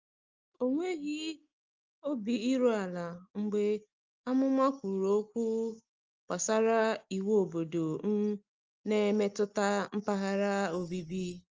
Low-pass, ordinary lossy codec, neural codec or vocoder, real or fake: 7.2 kHz; Opus, 24 kbps; none; real